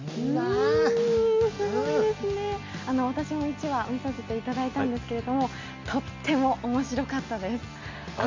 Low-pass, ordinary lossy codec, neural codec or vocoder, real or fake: 7.2 kHz; MP3, 48 kbps; none; real